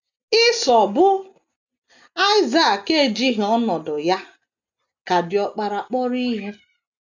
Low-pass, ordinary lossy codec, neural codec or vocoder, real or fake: 7.2 kHz; none; none; real